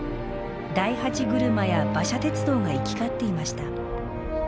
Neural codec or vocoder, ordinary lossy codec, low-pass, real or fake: none; none; none; real